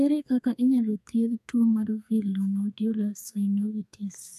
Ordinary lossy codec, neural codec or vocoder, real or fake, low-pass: none; codec, 32 kHz, 1.9 kbps, SNAC; fake; 14.4 kHz